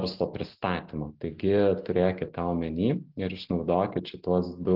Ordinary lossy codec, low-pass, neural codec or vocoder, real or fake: Opus, 16 kbps; 5.4 kHz; none; real